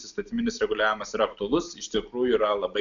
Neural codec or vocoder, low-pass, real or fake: none; 7.2 kHz; real